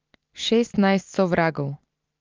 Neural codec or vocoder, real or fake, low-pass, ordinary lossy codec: none; real; 7.2 kHz; Opus, 24 kbps